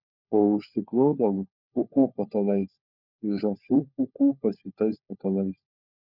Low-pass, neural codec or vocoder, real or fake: 5.4 kHz; codec, 16 kHz, 4 kbps, FunCodec, trained on LibriTTS, 50 frames a second; fake